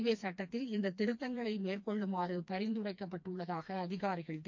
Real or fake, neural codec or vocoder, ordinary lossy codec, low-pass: fake; codec, 16 kHz, 2 kbps, FreqCodec, smaller model; MP3, 64 kbps; 7.2 kHz